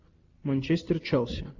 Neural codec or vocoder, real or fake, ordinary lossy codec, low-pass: none; real; AAC, 48 kbps; 7.2 kHz